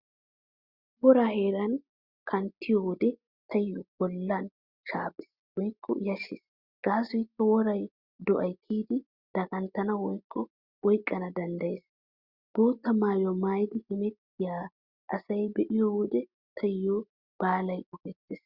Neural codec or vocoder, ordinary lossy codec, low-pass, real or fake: none; Opus, 64 kbps; 5.4 kHz; real